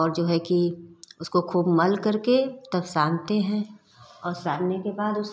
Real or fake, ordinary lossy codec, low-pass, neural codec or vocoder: real; none; none; none